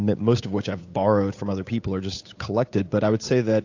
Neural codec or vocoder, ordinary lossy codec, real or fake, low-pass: codec, 16 kHz, 8 kbps, FunCodec, trained on Chinese and English, 25 frames a second; AAC, 48 kbps; fake; 7.2 kHz